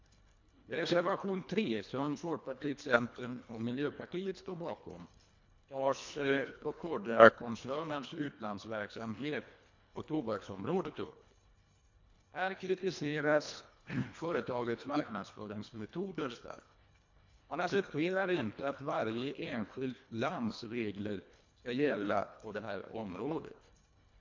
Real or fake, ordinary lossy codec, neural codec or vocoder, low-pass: fake; MP3, 48 kbps; codec, 24 kHz, 1.5 kbps, HILCodec; 7.2 kHz